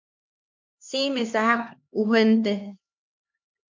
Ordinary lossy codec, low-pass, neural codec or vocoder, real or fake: MP3, 48 kbps; 7.2 kHz; codec, 16 kHz, 2 kbps, X-Codec, HuBERT features, trained on LibriSpeech; fake